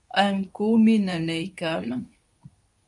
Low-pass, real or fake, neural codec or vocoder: 10.8 kHz; fake; codec, 24 kHz, 0.9 kbps, WavTokenizer, medium speech release version 2